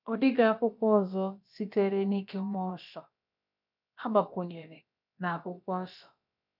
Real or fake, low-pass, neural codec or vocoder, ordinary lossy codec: fake; 5.4 kHz; codec, 16 kHz, about 1 kbps, DyCAST, with the encoder's durations; none